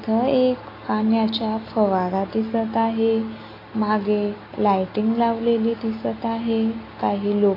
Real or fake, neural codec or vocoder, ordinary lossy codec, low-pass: real; none; AAC, 24 kbps; 5.4 kHz